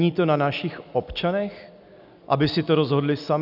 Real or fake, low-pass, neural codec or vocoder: real; 5.4 kHz; none